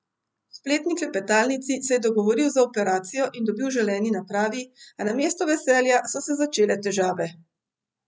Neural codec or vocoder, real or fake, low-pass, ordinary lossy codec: none; real; none; none